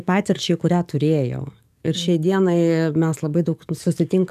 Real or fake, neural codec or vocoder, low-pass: fake; codec, 44.1 kHz, 7.8 kbps, DAC; 14.4 kHz